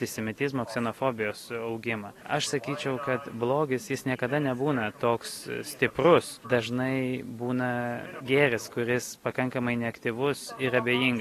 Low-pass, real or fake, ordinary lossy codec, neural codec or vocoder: 14.4 kHz; real; AAC, 48 kbps; none